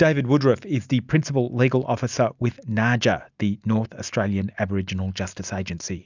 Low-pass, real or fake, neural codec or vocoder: 7.2 kHz; real; none